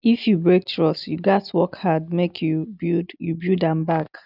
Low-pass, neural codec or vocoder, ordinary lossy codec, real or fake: 5.4 kHz; none; none; real